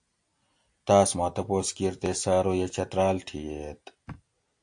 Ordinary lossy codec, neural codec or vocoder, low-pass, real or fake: AAC, 64 kbps; none; 9.9 kHz; real